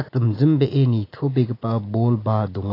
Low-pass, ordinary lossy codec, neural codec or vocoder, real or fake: 5.4 kHz; AAC, 24 kbps; none; real